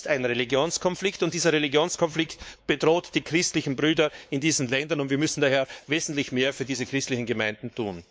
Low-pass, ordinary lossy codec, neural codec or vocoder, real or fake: none; none; codec, 16 kHz, 4 kbps, X-Codec, WavLM features, trained on Multilingual LibriSpeech; fake